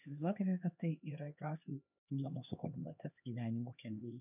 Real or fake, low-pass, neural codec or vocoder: fake; 3.6 kHz; codec, 16 kHz, 2 kbps, X-Codec, HuBERT features, trained on LibriSpeech